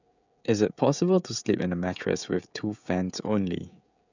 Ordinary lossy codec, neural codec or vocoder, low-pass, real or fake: none; codec, 16 kHz, 16 kbps, FreqCodec, smaller model; 7.2 kHz; fake